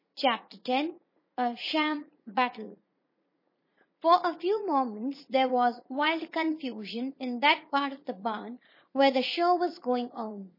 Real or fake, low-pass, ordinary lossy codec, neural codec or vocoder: fake; 5.4 kHz; MP3, 24 kbps; vocoder, 44.1 kHz, 80 mel bands, Vocos